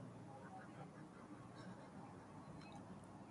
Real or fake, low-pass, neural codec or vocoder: real; 10.8 kHz; none